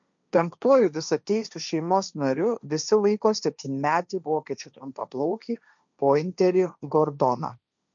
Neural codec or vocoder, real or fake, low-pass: codec, 16 kHz, 1.1 kbps, Voila-Tokenizer; fake; 7.2 kHz